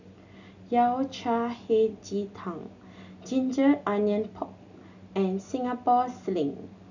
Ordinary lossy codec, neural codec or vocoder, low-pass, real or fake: none; none; 7.2 kHz; real